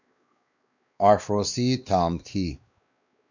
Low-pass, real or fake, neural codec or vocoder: 7.2 kHz; fake; codec, 16 kHz, 2 kbps, X-Codec, WavLM features, trained on Multilingual LibriSpeech